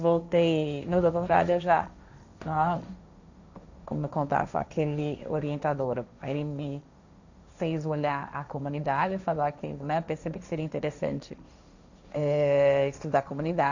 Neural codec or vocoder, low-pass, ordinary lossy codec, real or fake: codec, 16 kHz, 1.1 kbps, Voila-Tokenizer; 7.2 kHz; none; fake